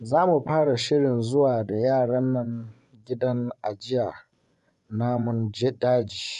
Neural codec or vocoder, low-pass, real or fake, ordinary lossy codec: vocoder, 24 kHz, 100 mel bands, Vocos; 10.8 kHz; fake; none